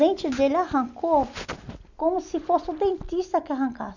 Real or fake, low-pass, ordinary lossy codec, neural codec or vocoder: real; 7.2 kHz; none; none